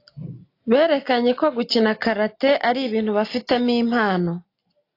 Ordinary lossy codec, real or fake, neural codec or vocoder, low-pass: AAC, 32 kbps; real; none; 5.4 kHz